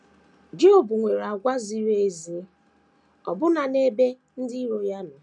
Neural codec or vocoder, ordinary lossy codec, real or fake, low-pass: none; none; real; 10.8 kHz